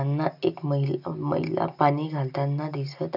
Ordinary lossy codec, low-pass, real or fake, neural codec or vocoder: none; 5.4 kHz; real; none